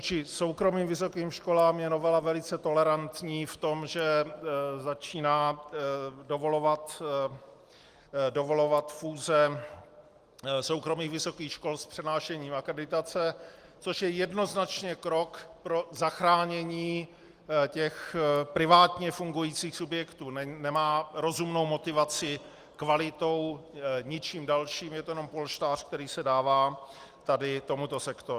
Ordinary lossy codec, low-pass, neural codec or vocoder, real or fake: Opus, 24 kbps; 14.4 kHz; none; real